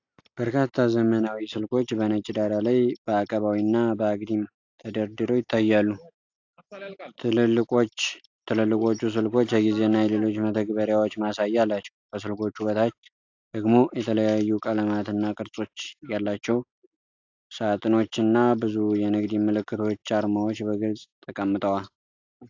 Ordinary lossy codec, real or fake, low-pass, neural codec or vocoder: AAC, 48 kbps; real; 7.2 kHz; none